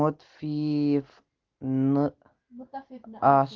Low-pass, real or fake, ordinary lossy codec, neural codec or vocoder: 7.2 kHz; real; Opus, 16 kbps; none